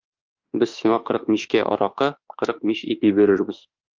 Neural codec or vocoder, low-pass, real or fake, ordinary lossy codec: autoencoder, 48 kHz, 32 numbers a frame, DAC-VAE, trained on Japanese speech; 7.2 kHz; fake; Opus, 24 kbps